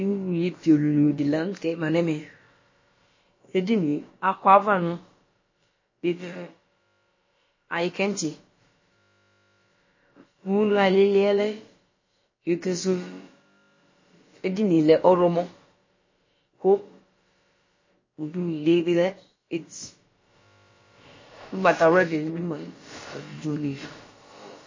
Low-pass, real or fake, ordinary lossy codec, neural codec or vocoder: 7.2 kHz; fake; MP3, 32 kbps; codec, 16 kHz, about 1 kbps, DyCAST, with the encoder's durations